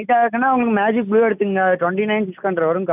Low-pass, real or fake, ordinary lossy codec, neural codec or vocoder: 3.6 kHz; real; none; none